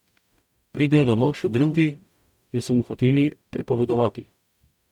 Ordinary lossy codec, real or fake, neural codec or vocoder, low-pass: none; fake; codec, 44.1 kHz, 0.9 kbps, DAC; 19.8 kHz